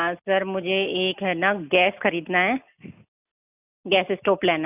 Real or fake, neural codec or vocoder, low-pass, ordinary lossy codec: real; none; 3.6 kHz; none